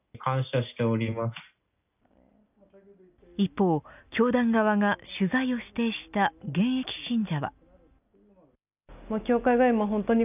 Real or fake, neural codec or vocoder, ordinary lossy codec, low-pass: real; none; none; 3.6 kHz